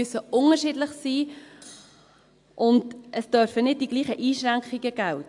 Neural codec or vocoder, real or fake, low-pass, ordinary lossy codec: none; real; 10.8 kHz; AAC, 64 kbps